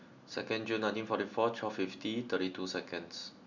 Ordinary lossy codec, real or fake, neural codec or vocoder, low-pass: none; real; none; 7.2 kHz